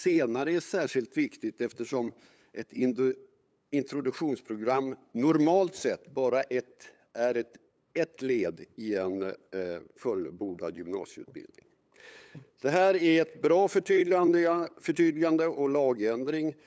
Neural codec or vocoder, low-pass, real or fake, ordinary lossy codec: codec, 16 kHz, 8 kbps, FunCodec, trained on LibriTTS, 25 frames a second; none; fake; none